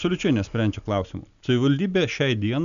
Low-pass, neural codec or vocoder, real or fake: 7.2 kHz; none; real